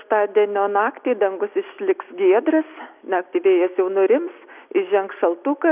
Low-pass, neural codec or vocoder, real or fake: 3.6 kHz; none; real